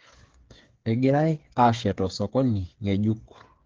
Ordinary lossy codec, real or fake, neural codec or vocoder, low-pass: Opus, 16 kbps; fake; codec, 16 kHz, 8 kbps, FreqCodec, smaller model; 7.2 kHz